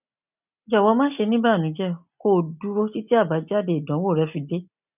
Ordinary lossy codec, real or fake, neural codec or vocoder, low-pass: none; real; none; 3.6 kHz